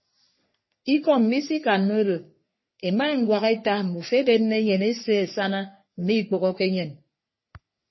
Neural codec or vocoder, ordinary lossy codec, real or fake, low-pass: codec, 44.1 kHz, 3.4 kbps, Pupu-Codec; MP3, 24 kbps; fake; 7.2 kHz